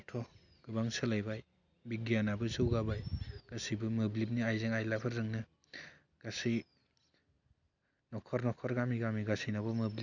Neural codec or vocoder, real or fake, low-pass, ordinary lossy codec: none; real; 7.2 kHz; none